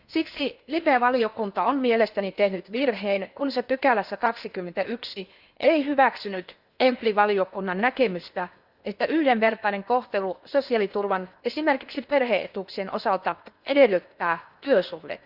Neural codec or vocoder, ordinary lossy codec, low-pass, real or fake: codec, 16 kHz in and 24 kHz out, 0.8 kbps, FocalCodec, streaming, 65536 codes; Opus, 64 kbps; 5.4 kHz; fake